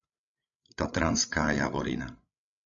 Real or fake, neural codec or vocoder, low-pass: fake; codec, 16 kHz, 16 kbps, FreqCodec, larger model; 7.2 kHz